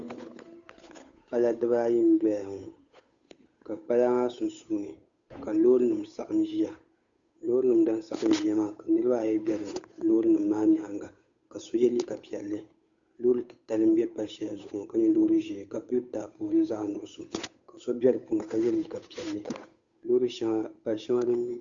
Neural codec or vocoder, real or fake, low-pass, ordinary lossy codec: codec, 16 kHz, 8 kbps, FunCodec, trained on Chinese and English, 25 frames a second; fake; 7.2 kHz; Opus, 64 kbps